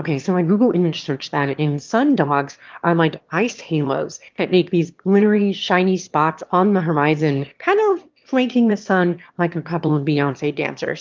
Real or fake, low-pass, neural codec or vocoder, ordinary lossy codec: fake; 7.2 kHz; autoencoder, 22.05 kHz, a latent of 192 numbers a frame, VITS, trained on one speaker; Opus, 32 kbps